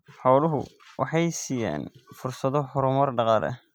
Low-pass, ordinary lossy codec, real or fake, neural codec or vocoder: none; none; real; none